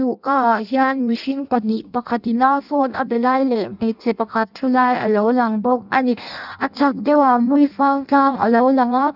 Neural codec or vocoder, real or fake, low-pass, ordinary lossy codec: codec, 16 kHz in and 24 kHz out, 0.6 kbps, FireRedTTS-2 codec; fake; 5.4 kHz; none